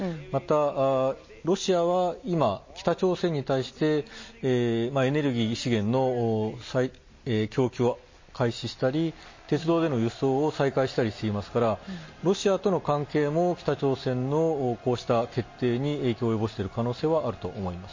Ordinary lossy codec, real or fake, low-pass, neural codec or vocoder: MP3, 32 kbps; real; 7.2 kHz; none